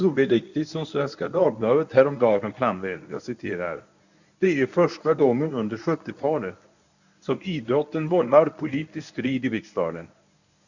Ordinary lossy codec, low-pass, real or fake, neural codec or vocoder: none; 7.2 kHz; fake; codec, 24 kHz, 0.9 kbps, WavTokenizer, medium speech release version 1